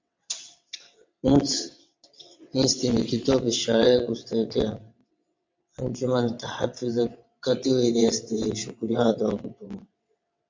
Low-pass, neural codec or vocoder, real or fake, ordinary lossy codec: 7.2 kHz; vocoder, 22.05 kHz, 80 mel bands, WaveNeXt; fake; MP3, 48 kbps